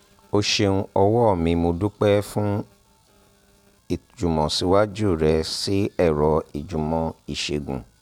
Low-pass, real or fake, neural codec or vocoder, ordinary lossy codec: 19.8 kHz; real; none; none